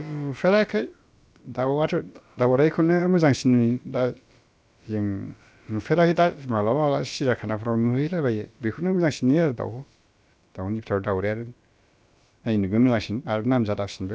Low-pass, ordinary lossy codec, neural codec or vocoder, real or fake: none; none; codec, 16 kHz, about 1 kbps, DyCAST, with the encoder's durations; fake